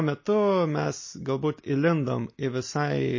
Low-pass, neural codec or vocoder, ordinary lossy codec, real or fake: 7.2 kHz; none; MP3, 32 kbps; real